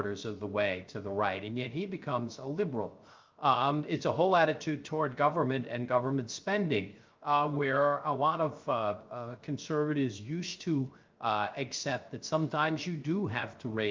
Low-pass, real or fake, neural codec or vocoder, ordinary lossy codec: 7.2 kHz; fake; codec, 16 kHz, 0.3 kbps, FocalCodec; Opus, 24 kbps